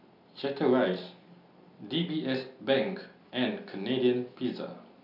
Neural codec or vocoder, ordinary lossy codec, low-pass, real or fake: none; none; 5.4 kHz; real